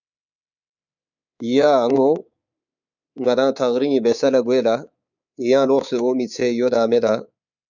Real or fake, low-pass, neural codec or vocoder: fake; 7.2 kHz; codec, 24 kHz, 3.1 kbps, DualCodec